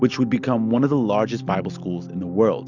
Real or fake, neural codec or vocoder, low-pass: real; none; 7.2 kHz